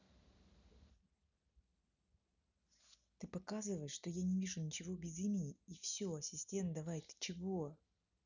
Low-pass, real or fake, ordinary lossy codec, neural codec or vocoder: 7.2 kHz; real; none; none